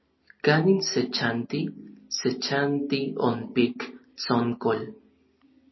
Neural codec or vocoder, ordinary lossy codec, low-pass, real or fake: none; MP3, 24 kbps; 7.2 kHz; real